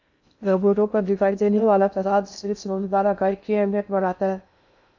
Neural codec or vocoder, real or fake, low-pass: codec, 16 kHz in and 24 kHz out, 0.6 kbps, FocalCodec, streaming, 2048 codes; fake; 7.2 kHz